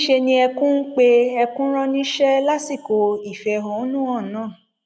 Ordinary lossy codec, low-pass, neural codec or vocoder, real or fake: none; none; none; real